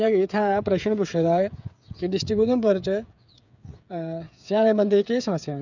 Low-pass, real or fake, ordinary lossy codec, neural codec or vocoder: 7.2 kHz; fake; none; codec, 16 kHz, 8 kbps, FreqCodec, smaller model